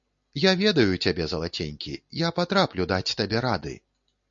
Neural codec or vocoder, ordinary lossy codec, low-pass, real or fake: none; MP3, 48 kbps; 7.2 kHz; real